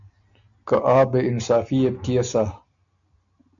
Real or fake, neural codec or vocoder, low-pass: real; none; 7.2 kHz